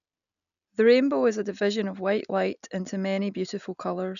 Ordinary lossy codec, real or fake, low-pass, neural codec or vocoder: none; real; 7.2 kHz; none